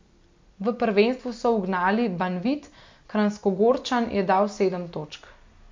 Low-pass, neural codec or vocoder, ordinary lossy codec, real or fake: 7.2 kHz; none; MP3, 48 kbps; real